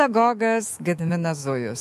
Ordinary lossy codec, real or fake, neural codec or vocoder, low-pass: MP3, 64 kbps; fake; autoencoder, 48 kHz, 128 numbers a frame, DAC-VAE, trained on Japanese speech; 14.4 kHz